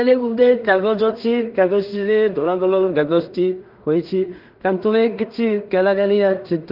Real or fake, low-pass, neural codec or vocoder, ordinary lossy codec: fake; 5.4 kHz; codec, 16 kHz in and 24 kHz out, 0.4 kbps, LongCat-Audio-Codec, two codebook decoder; Opus, 32 kbps